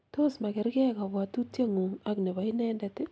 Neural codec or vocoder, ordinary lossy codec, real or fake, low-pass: none; none; real; none